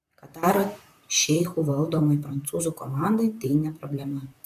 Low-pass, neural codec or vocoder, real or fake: 14.4 kHz; vocoder, 44.1 kHz, 128 mel bands, Pupu-Vocoder; fake